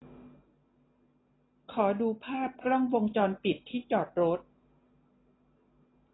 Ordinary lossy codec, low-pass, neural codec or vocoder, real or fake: AAC, 16 kbps; 7.2 kHz; none; real